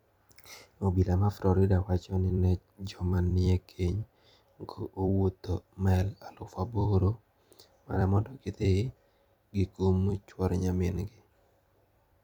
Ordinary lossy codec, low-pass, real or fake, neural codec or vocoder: none; 19.8 kHz; fake; vocoder, 44.1 kHz, 128 mel bands every 256 samples, BigVGAN v2